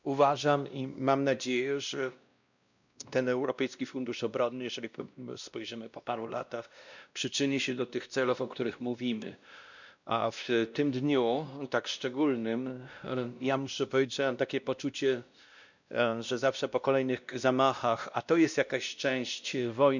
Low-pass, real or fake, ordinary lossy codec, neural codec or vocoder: 7.2 kHz; fake; none; codec, 16 kHz, 1 kbps, X-Codec, WavLM features, trained on Multilingual LibriSpeech